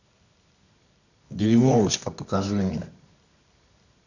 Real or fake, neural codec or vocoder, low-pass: fake; codec, 24 kHz, 0.9 kbps, WavTokenizer, medium music audio release; 7.2 kHz